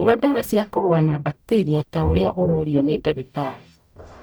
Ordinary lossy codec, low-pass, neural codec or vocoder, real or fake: none; none; codec, 44.1 kHz, 0.9 kbps, DAC; fake